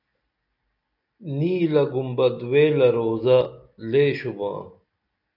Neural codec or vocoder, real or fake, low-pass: none; real; 5.4 kHz